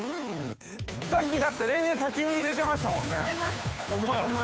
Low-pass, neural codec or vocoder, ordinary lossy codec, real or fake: none; codec, 16 kHz, 2 kbps, FunCodec, trained on Chinese and English, 25 frames a second; none; fake